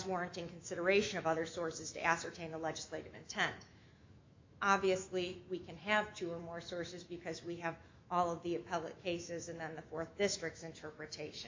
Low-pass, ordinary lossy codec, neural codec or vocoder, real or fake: 7.2 kHz; MP3, 48 kbps; autoencoder, 48 kHz, 128 numbers a frame, DAC-VAE, trained on Japanese speech; fake